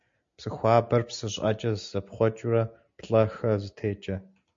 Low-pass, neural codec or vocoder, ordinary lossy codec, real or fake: 7.2 kHz; none; MP3, 48 kbps; real